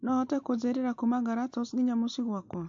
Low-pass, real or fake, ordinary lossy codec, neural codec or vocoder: 7.2 kHz; real; MP3, 48 kbps; none